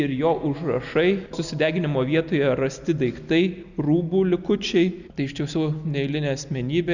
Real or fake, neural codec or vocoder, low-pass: real; none; 7.2 kHz